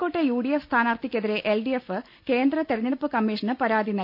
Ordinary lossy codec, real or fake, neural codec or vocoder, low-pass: none; real; none; 5.4 kHz